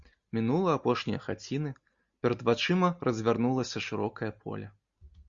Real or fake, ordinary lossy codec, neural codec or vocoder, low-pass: real; Opus, 64 kbps; none; 7.2 kHz